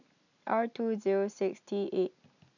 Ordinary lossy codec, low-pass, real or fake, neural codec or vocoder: none; 7.2 kHz; real; none